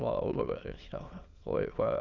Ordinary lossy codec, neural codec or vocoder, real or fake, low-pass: Opus, 64 kbps; autoencoder, 22.05 kHz, a latent of 192 numbers a frame, VITS, trained on many speakers; fake; 7.2 kHz